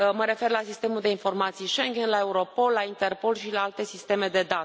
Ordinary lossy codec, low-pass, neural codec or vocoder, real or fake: none; none; none; real